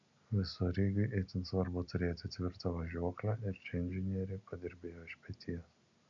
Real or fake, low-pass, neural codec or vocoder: real; 7.2 kHz; none